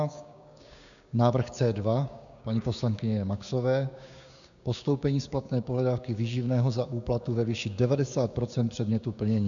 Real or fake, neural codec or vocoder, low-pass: fake; codec, 16 kHz, 6 kbps, DAC; 7.2 kHz